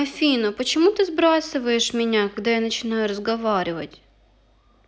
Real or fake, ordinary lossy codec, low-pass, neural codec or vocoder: real; none; none; none